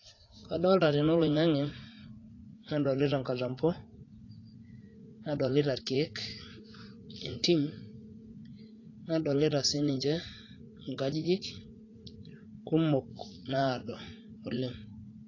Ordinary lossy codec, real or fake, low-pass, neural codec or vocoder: AAC, 32 kbps; fake; 7.2 kHz; vocoder, 44.1 kHz, 80 mel bands, Vocos